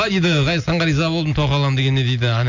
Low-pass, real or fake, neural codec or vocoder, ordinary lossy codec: 7.2 kHz; real; none; none